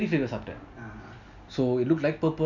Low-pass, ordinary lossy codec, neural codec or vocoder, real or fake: 7.2 kHz; none; none; real